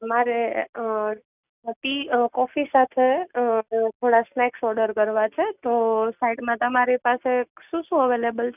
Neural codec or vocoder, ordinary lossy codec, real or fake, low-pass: none; none; real; 3.6 kHz